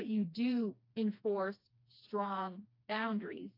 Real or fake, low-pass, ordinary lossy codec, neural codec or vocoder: fake; 5.4 kHz; AAC, 32 kbps; codec, 16 kHz, 2 kbps, FreqCodec, smaller model